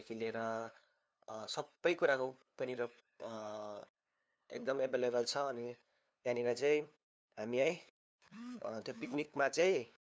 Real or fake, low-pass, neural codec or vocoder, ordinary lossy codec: fake; none; codec, 16 kHz, 2 kbps, FunCodec, trained on LibriTTS, 25 frames a second; none